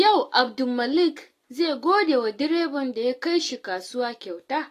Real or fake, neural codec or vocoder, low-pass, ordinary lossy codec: real; none; 14.4 kHz; AAC, 48 kbps